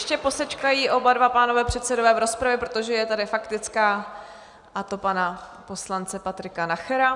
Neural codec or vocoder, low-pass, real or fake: none; 10.8 kHz; real